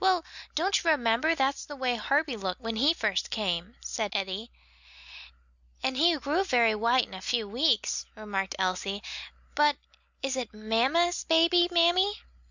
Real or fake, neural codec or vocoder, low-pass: real; none; 7.2 kHz